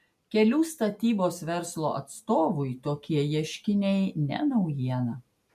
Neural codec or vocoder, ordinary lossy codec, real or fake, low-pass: none; MP3, 96 kbps; real; 14.4 kHz